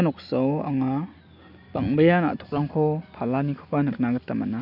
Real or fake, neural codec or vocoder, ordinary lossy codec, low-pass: real; none; none; 5.4 kHz